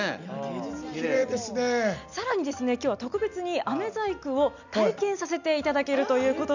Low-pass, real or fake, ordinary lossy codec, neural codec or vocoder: 7.2 kHz; real; none; none